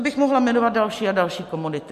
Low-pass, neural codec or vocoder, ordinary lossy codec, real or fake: 14.4 kHz; none; MP3, 64 kbps; real